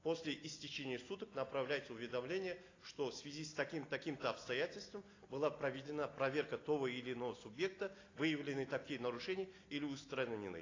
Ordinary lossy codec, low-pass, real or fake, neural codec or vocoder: AAC, 32 kbps; 7.2 kHz; real; none